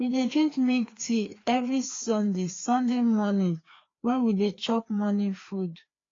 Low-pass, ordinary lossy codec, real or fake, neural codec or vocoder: 7.2 kHz; AAC, 32 kbps; fake; codec, 16 kHz, 2 kbps, FreqCodec, larger model